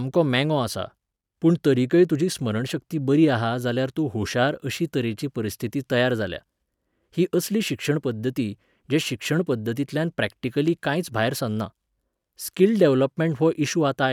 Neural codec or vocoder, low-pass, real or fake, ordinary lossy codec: none; 19.8 kHz; real; none